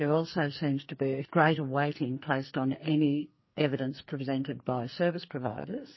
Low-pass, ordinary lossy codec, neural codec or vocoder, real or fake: 7.2 kHz; MP3, 24 kbps; codec, 44.1 kHz, 2.6 kbps, SNAC; fake